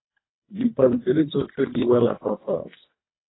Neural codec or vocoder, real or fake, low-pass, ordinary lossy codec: codec, 24 kHz, 1.5 kbps, HILCodec; fake; 7.2 kHz; AAC, 16 kbps